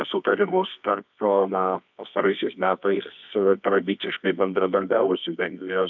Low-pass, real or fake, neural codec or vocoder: 7.2 kHz; fake; codec, 24 kHz, 0.9 kbps, WavTokenizer, medium music audio release